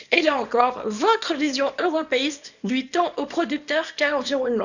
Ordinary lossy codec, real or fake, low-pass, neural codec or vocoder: none; fake; 7.2 kHz; codec, 24 kHz, 0.9 kbps, WavTokenizer, small release